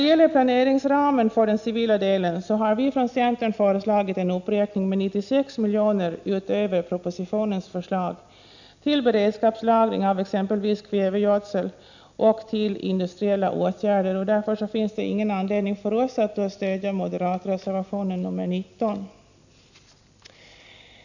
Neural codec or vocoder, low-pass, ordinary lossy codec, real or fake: none; 7.2 kHz; none; real